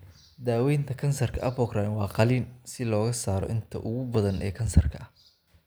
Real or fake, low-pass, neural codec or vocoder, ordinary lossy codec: real; none; none; none